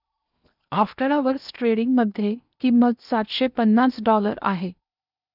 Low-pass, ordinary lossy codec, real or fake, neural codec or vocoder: 5.4 kHz; none; fake; codec, 16 kHz in and 24 kHz out, 0.8 kbps, FocalCodec, streaming, 65536 codes